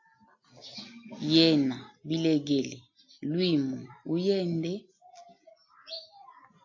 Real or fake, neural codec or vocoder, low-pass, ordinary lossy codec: real; none; 7.2 kHz; AAC, 48 kbps